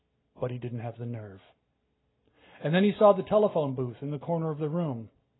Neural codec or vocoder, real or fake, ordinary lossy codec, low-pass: none; real; AAC, 16 kbps; 7.2 kHz